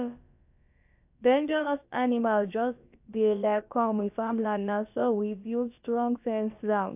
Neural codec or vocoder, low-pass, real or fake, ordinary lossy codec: codec, 16 kHz, about 1 kbps, DyCAST, with the encoder's durations; 3.6 kHz; fake; AAC, 32 kbps